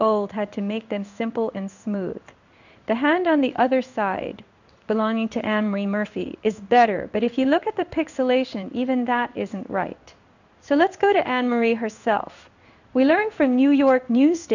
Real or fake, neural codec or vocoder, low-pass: fake; codec, 16 kHz in and 24 kHz out, 1 kbps, XY-Tokenizer; 7.2 kHz